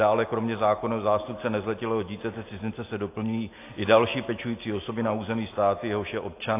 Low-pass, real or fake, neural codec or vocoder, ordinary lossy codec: 3.6 kHz; real; none; AAC, 24 kbps